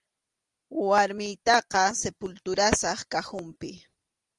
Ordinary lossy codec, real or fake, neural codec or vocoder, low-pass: Opus, 24 kbps; real; none; 10.8 kHz